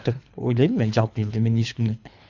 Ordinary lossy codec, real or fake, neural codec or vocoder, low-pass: none; fake; codec, 24 kHz, 3 kbps, HILCodec; 7.2 kHz